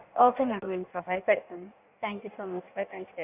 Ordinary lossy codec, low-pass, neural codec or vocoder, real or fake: none; 3.6 kHz; codec, 16 kHz in and 24 kHz out, 1.1 kbps, FireRedTTS-2 codec; fake